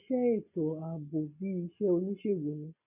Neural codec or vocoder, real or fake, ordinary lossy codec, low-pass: none; real; none; 3.6 kHz